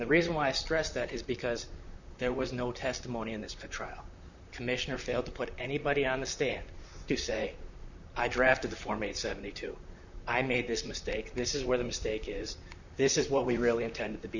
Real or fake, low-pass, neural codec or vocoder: fake; 7.2 kHz; vocoder, 44.1 kHz, 128 mel bands, Pupu-Vocoder